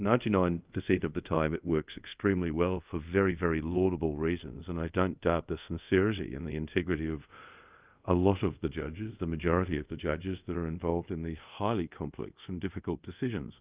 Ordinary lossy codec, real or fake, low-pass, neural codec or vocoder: Opus, 32 kbps; fake; 3.6 kHz; codec, 24 kHz, 0.5 kbps, DualCodec